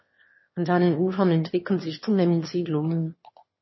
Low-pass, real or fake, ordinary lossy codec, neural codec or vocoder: 7.2 kHz; fake; MP3, 24 kbps; autoencoder, 22.05 kHz, a latent of 192 numbers a frame, VITS, trained on one speaker